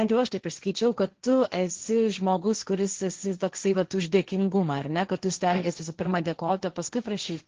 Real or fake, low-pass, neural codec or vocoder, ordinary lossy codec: fake; 7.2 kHz; codec, 16 kHz, 1.1 kbps, Voila-Tokenizer; Opus, 16 kbps